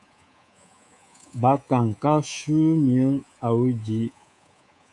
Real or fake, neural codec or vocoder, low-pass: fake; codec, 24 kHz, 3.1 kbps, DualCodec; 10.8 kHz